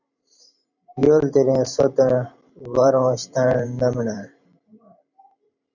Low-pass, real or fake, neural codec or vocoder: 7.2 kHz; fake; vocoder, 44.1 kHz, 128 mel bands every 512 samples, BigVGAN v2